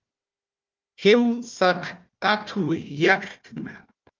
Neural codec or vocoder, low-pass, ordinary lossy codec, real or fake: codec, 16 kHz, 1 kbps, FunCodec, trained on Chinese and English, 50 frames a second; 7.2 kHz; Opus, 24 kbps; fake